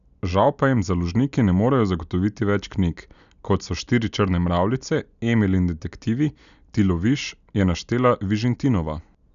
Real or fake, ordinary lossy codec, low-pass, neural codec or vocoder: real; none; 7.2 kHz; none